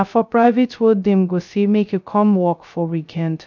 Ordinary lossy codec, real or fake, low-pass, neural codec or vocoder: none; fake; 7.2 kHz; codec, 16 kHz, 0.2 kbps, FocalCodec